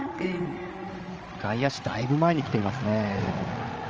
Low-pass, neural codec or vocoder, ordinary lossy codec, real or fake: 7.2 kHz; codec, 16 kHz, 16 kbps, FreqCodec, larger model; Opus, 24 kbps; fake